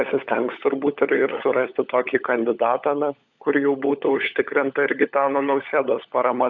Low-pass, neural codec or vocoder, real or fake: 7.2 kHz; codec, 16 kHz, 16 kbps, FunCodec, trained on LibriTTS, 50 frames a second; fake